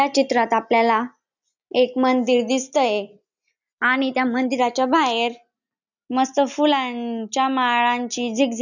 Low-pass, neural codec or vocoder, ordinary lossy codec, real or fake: 7.2 kHz; none; none; real